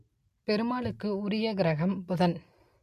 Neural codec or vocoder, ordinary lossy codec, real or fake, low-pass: none; MP3, 64 kbps; real; 14.4 kHz